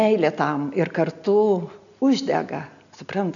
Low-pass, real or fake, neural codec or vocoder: 7.2 kHz; real; none